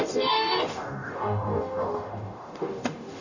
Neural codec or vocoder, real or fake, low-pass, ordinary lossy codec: codec, 44.1 kHz, 0.9 kbps, DAC; fake; 7.2 kHz; none